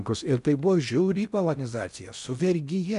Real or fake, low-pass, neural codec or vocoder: fake; 10.8 kHz; codec, 16 kHz in and 24 kHz out, 0.6 kbps, FocalCodec, streaming, 2048 codes